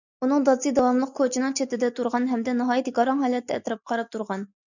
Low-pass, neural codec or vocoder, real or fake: 7.2 kHz; none; real